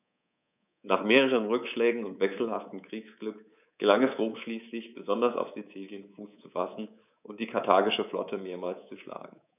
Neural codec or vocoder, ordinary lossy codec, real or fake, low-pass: codec, 24 kHz, 3.1 kbps, DualCodec; none; fake; 3.6 kHz